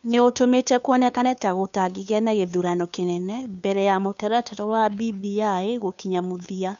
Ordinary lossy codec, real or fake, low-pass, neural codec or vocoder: none; fake; 7.2 kHz; codec, 16 kHz, 2 kbps, FunCodec, trained on Chinese and English, 25 frames a second